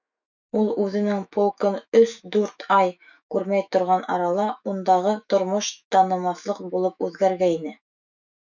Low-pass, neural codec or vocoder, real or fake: 7.2 kHz; autoencoder, 48 kHz, 128 numbers a frame, DAC-VAE, trained on Japanese speech; fake